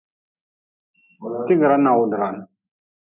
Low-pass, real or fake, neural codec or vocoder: 3.6 kHz; real; none